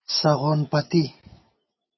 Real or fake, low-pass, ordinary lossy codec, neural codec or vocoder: real; 7.2 kHz; MP3, 24 kbps; none